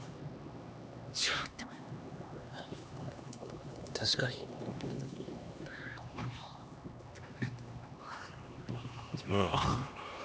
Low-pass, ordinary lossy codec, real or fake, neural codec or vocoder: none; none; fake; codec, 16 kHz, 2 kbps, X-Codec, HuBERT features, trained on LibriSpeech